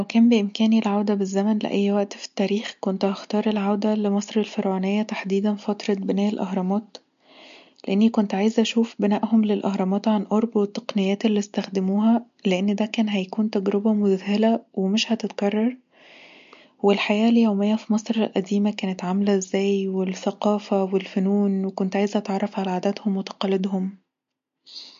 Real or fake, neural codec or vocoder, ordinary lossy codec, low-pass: real; none; MP3, 48 kbps; 7.2 kHz